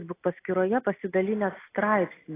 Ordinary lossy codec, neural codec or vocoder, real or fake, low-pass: AAC, 16 kbps; none; real; 3.6 kHz